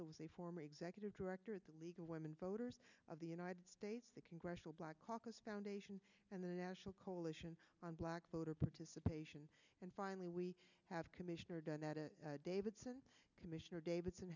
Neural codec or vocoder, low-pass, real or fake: none; 7.2 kHz; real